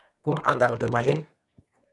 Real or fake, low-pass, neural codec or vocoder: fake; 10.8 kHz; codec, 24 kHz, 1 kbps, SNAC